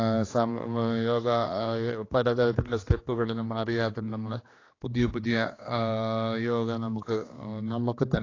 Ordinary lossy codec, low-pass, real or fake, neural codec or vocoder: AAC, 32 kbps; 7.2 kHz; fake; codec, 16 kHz, 2 kbps, X-Codec, HuBERT features, trained on general audio